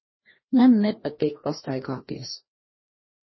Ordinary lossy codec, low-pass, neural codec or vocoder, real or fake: MP3, 24 kbps; 7.2 kHz; codec, 16 kHz, 1 kbps, FreqCodec, larger model; fake